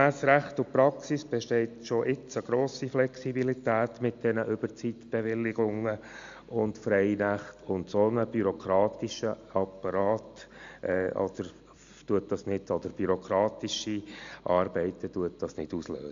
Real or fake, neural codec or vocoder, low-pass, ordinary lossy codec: real; none; 7.2 kHz; AAC, 64 kbps